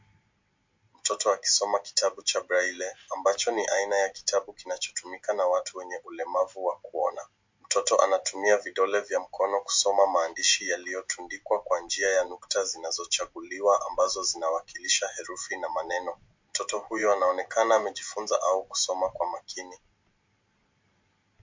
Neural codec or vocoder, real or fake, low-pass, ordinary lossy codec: none; real; 7.2 kHz; MP3, 48 kbps